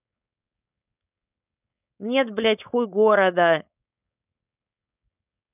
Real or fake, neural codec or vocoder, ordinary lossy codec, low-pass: fake; codec, 16 kHz, 4.8 kbps, FACodec; none; 3.6 kHz